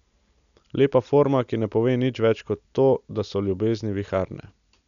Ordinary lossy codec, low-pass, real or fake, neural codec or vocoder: none; 7.2 kHz; real; none